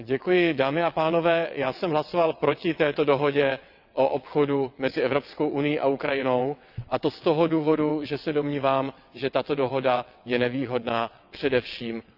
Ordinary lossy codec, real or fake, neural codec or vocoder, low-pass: none; fake; vocoder, 22.05 kHz, 80 mel bands, WaveNeXt; 5.4 kHz